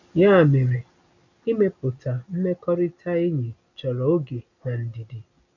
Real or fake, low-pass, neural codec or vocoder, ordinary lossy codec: real; 7.2 kHz; none; none